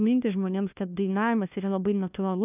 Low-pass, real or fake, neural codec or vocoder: 3.6 kHz; fake; codec, 16 kHz, 1 kbps, FunCodec, trained on LibriTTS, 50 frames a second